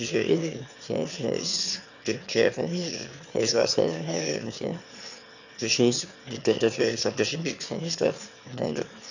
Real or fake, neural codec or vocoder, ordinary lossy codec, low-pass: fake; autoencoder, 22.05 kHz, a latent of 192 numbers a frame, VITS, trained on one speaker; none; 7.2 kHz